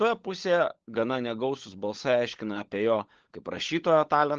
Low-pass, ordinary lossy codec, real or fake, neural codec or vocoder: 7.2 kHz; Opus, 16 kbps; fake; codec, 16 kHz, 16 kbps, FunCodec, trained on LibriTTS, 50 frames a second